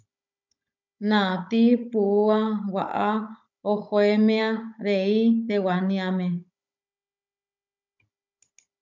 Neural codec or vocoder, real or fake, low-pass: codec, 16 kHz, 16 kbps, FunCodec, trained on Chinese and English, 50 frames a second; fake; 7.2 kHz